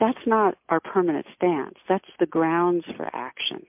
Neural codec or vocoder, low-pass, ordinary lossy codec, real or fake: none; 3.6 kHz; MP3, 32 kbps; real